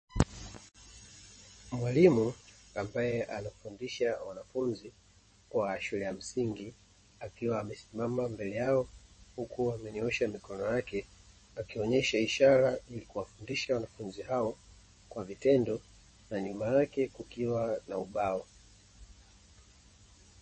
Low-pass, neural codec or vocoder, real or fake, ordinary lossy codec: 10.8 kHz; vocoder, 24 kHz, 100 mel bands, Vocos; fake; MP3, 32 kbps